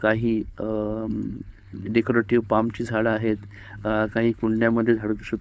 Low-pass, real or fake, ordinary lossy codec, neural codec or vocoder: none; fake; none; codec, 16 kHz, 4.8 kbps, FACodec